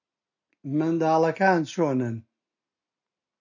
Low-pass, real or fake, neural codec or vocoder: 7.2 kHz; real; none